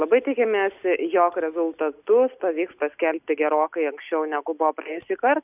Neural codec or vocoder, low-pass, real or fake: none; 3.6 kHz; real